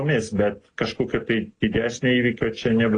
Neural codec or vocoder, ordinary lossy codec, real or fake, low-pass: none; AAC, 32 kbps; real; 9.9 kHz